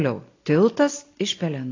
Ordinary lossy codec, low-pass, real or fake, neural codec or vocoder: AAC, 32 kbps; 7.2 kHz; real; none